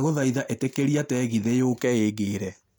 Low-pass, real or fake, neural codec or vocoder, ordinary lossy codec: none; real; none; none